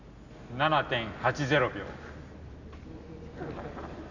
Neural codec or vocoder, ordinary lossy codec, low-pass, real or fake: none; none; 7.2 kHz; real